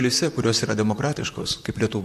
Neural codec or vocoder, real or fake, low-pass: vocoder, 44.1 kHz, 128 mel bands, Pupu-Vocoder; fake; 14.4 kHz